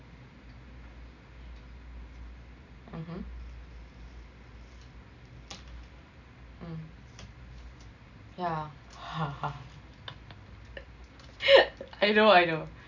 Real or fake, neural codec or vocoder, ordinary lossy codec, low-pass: real; none; none; 7.2 kHz